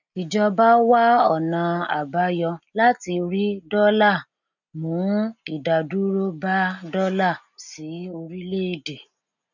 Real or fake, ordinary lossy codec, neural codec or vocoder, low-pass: real; none; none; 7.2 kHz